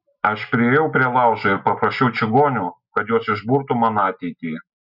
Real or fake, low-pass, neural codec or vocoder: real; 5.4 kHz; none